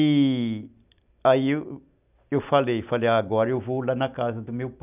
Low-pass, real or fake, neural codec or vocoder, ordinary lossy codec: 3.6 kHz; real; none; none